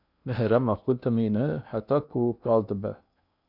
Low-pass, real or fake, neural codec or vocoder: 5.4 kHz; fake; codec, 16 kHz in and 24 kHz out, 0.8 kbps, FocalCodec, streaming, 65536 codes